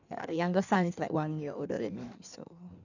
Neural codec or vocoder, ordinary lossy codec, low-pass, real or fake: codec, 16 kHz in and 24 kHz out, 1.1 kbps, FireRedTTS-2 codec; none; 7.2 kHz; fake